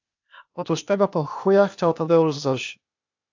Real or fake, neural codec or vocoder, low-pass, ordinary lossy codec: fake; codec, 16 kHz, 0.8 kbps, ZipCodec; 7.2 kHz; AAC, 48 kbps